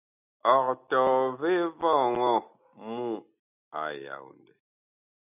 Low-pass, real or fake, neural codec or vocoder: 3.6 kHz; real; none